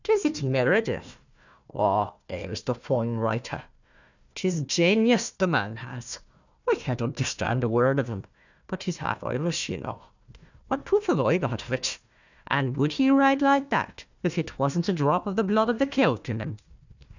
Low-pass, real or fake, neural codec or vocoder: 7.2 kHz; fake; codec, 16 kHz, 1 kbps, FunCodec, trained on Chinese and English, 50 frames a second